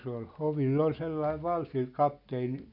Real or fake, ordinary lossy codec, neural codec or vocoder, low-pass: real; none; none; 5.4 kHz